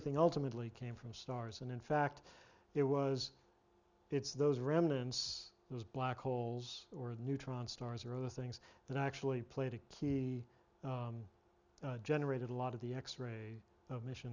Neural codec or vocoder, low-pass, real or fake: none; 7.2 kHz; real